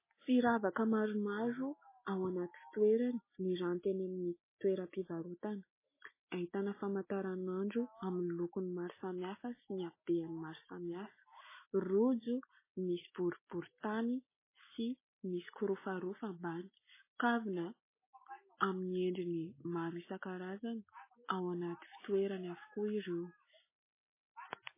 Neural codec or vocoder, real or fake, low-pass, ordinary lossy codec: none; real; 3.6 kHz; MP3, 16 kbps